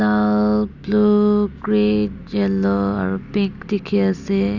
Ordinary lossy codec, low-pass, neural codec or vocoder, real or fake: none; 7.2 kHz; none; real